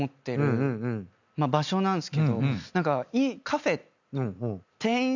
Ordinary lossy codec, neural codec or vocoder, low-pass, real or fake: none; none; 7.2 kHz; real